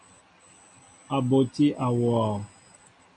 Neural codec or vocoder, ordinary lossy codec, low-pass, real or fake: none; AAC, 64 kbps; 9.9 kHz; real